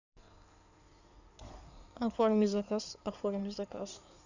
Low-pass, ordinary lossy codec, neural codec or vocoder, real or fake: 7.2 kHz; none; codec, 16 kHz in and 24 kHz out, 2.2 kbps, FireRedTTS-2 codec; fake